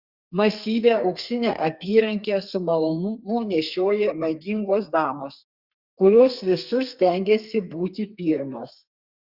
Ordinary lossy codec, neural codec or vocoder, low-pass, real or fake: Opus, 64 kbps; codec, 32 kHz, 1.9 kbps, SNAC; 5.4 kHz; fake